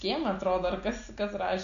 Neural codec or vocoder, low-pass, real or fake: none; 7.2 kHz; real